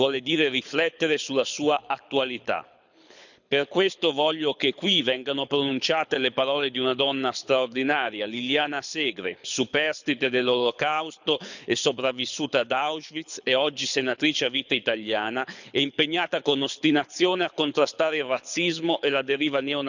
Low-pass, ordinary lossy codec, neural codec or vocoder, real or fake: 7.2 kHz; none; codec, 24 kHz, 6 kbps, HILCodec; fake